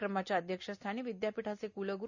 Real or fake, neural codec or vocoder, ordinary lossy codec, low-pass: real; none; none; 7.2 kHz